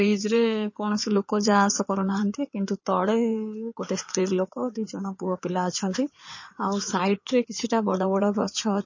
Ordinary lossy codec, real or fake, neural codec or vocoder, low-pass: MP3, 32 kbps; fake; codec, 16 kHz in and 24 kHz out, 2.2 kbps, FireRedTTS-2 codec; 7.2 kHz